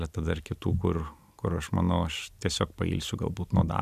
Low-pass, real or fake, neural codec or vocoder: 14.4 kHz; real; none